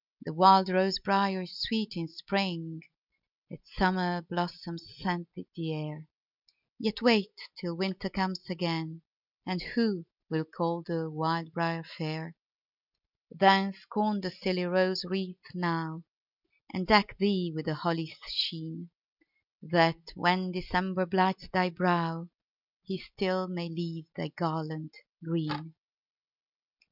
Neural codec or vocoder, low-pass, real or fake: none; 5.4 kHz; real